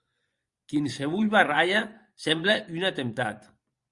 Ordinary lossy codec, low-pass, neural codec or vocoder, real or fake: Opus, 64 kbps; 10.8 kHz; vocoder, 24 kHz, 100 mel bands, Vocos; fake